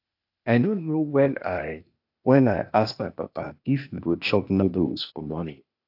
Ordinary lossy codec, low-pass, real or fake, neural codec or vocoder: AAC, 48 kbps; 5.4 kHz; fake; codec, 16 kHz, 0.8 kbps, ZipCodec